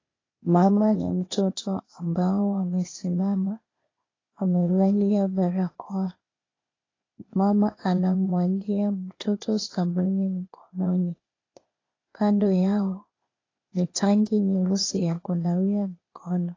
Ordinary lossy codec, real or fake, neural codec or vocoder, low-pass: AAC, 32 kbps; fake; codec, 16 kHz, 0.8 kbps, ZipCodec; 7.2 kHz